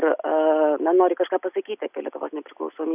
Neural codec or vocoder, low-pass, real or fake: none; 3.6 kHz; real